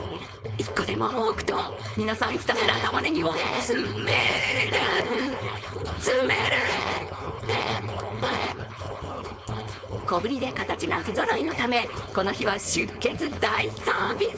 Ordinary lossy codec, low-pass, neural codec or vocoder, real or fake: none; none; codec, 16 kHz, 4.8 kbps, FACodec; fake